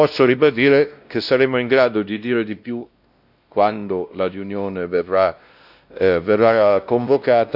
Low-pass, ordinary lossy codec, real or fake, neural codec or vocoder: 5.4 kHz; AAC, 48 kbps; fake; codec, 16 kHz, 1 kbps, X-Codec, WavLM features, trained on Multilingual LibriSpeech